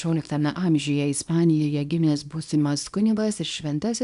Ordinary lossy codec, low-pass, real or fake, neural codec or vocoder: MP3, 96 kbps; 10.8 kHz; fake; codec, 24 kHz, 0.9 kbps, WavTokenizer, small release